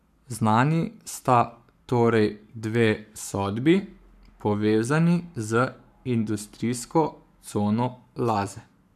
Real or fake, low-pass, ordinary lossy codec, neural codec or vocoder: fake; 14.4 kHz; none; codec, 44.1 kHz, 7.8 kbps, Pupu-Codec